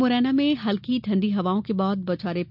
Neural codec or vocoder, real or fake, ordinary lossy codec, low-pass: none; real; none; 5.4 kHz